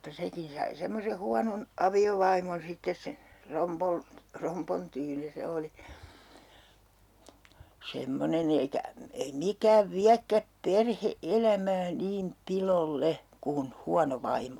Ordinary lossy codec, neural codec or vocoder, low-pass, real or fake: none; none; 19.8 kHz; real